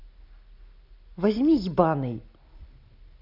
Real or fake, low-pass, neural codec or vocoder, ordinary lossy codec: real; 5.4 kHz; none; none